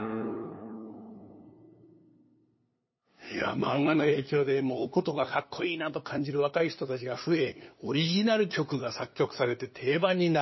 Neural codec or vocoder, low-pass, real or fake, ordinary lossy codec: codec, 16 kHz, 2 kbps, FunCodec, trained on LibriTTS, 25 frames a second; 7.2 kHz; fake; MP3, 24 kbps